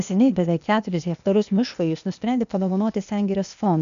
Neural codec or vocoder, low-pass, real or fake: codec, 16 kHz, 0.8 kbps, ZipCodec; 7.2 kHz; fake